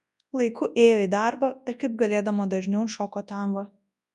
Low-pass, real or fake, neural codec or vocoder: 10.8 kHz; fake; codec, 24 kHz, 0.9 kbps, WavTokenizer, large speech release